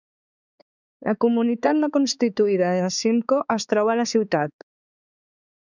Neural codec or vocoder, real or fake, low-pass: codec, 16 kHz, 4 kbps, X-Codec, HuBERT features, trained on balanced general audio; fake; 7.2 kHz